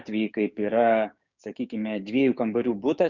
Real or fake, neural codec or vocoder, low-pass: fake; codec, 16 kHz, 6 kbps, DAC; 7.2 kHz